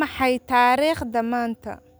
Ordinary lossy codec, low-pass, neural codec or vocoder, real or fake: none; none; none; real